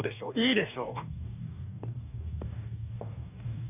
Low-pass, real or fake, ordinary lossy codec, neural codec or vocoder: 3.6 kHz; fake; none; codec, 44.1 kHz, 2.6 kbps, DAC